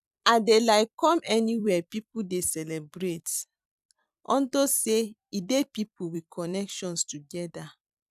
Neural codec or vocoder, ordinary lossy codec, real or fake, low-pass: vocoder, 44.1 kHz, 128 mel bands every 256 samples, BigVGAN v2; none; fake; 14.4 kHz